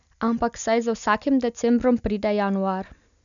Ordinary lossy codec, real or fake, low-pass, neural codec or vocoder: MP3, 96 kbps; real; 7.2 kHz; none